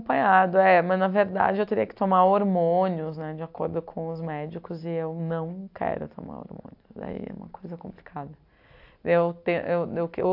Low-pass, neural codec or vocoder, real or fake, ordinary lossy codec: 5.4 kHz; none; real; AAC, 48 kbps